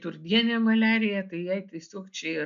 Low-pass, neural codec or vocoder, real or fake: 7.2 kHz; none; real